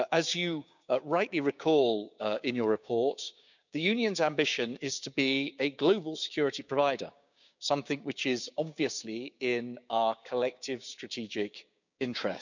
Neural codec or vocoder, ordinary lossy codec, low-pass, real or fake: codec, 16 kHz, 6 kbps, DAC; none; 7.2 kHz; fake